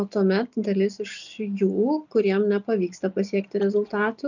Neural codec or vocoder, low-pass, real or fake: none; 7.2 kHz; real